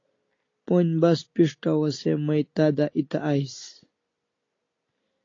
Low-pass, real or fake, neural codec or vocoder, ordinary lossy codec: 7.2 kHz; real; none; AAC, 32 kbps